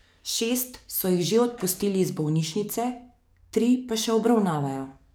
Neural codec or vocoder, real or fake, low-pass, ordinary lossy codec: codec, 44.1 kHz, 7.8 kbps, DAC; fake; none; none